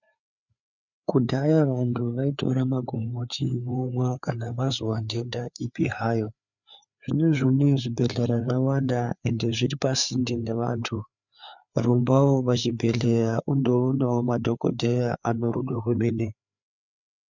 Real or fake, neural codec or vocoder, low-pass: fake; codec, 16 kHz, 4 kbps, FreqCodec, larger model; 7.2 kHz